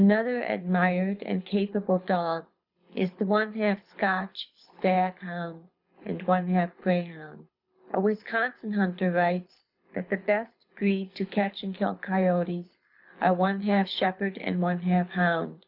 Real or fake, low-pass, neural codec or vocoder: fake; 5.4 kHz; codec, 24 kHz, 6 kbps, HILCodec